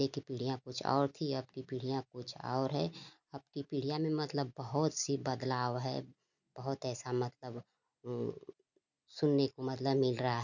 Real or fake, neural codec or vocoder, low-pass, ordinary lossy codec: real; none; 7.2 kHz; none